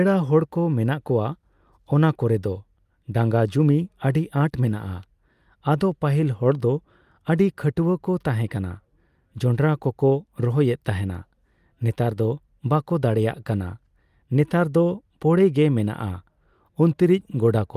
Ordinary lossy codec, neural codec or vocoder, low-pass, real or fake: Opus, 32 kbps; none; 14.4 kHz; real